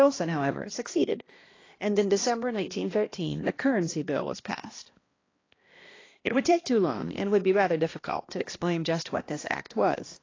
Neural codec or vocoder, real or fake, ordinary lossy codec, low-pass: codec, 16 kHz, 1 kbps, X-Codec, HuBERT features, trained on balanced general audio; fake; AAC, 32 kbps; 7.2 kHz